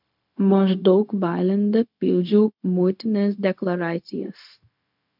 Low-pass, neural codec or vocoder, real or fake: 5.4 kHz; codec, 16 kHz, 0.4 kbps, LongCat-Audio-Codec; fake